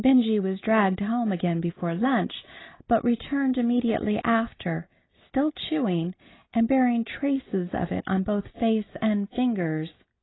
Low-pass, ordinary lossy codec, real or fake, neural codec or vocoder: 7.2 kHz; AAC, 16 kbps; real; none